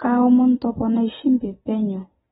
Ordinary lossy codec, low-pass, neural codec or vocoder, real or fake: AAC, 16 kbps; 10.8 kHz; none; real